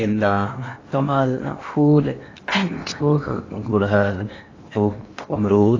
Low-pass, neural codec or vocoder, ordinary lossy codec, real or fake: 7.2 kHz; codec, 16 kHz in and 24 kHz out, 0.8 kbps, FocalCodec, streaming, 65536 codes; AAC, 32 kbps; fake